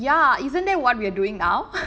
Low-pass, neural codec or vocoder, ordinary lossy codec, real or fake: none; none; none; real